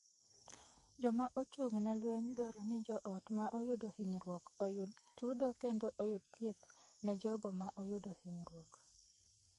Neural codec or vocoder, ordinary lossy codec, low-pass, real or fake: codec, 44.1 kHz, 2.6 kbps, SNAC; MP3, 48 kbps; 14.4 kHz; fake